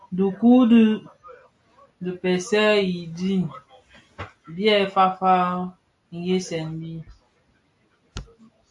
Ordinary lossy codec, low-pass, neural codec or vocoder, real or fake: AAC, 64 kbps; 10.8 kHz; none; real